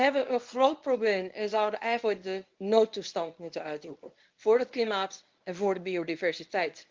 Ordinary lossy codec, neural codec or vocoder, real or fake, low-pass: Opus, 32 kbps; codec, 24 kHz, 0.9 kbps, WavTokenizer, medium speech release version 1; fake; 7.2 kHz